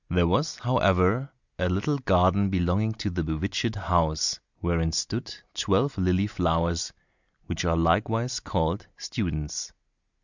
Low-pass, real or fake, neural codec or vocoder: 7.2 kHz; real; none